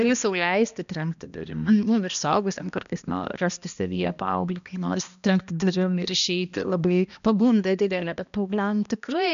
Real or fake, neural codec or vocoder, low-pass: fake; codec, 16 kHz, 1 kbps, X-Codec, HuBERT features, trained on balanced general audio; 7.2 kHz